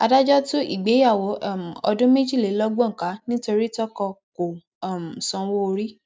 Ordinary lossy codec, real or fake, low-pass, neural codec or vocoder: none; real; none; none